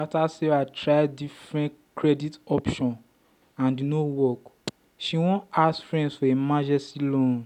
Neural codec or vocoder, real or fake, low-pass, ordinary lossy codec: none; real; 19.8 kHz; none